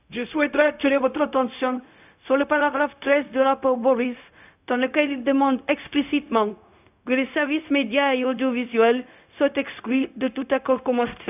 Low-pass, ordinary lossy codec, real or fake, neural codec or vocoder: 3.6 kHz; none; fake; codec, 16 kHz, 0.4 kbps, LongCat-Audio-Codec